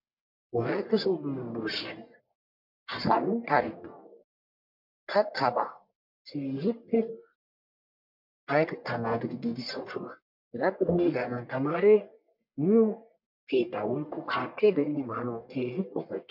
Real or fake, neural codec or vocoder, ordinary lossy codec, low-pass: fake; codec, 44.1 kHz, 1.7 kbps, Pupu-Codec; MP3, 48 kbps; 5.4 kHz